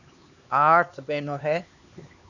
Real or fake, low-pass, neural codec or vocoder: fake; 7.2 kHz; codec, 16 kHz, 2 kbps, X-Codec, HuBERT features, trained on LibriSpeech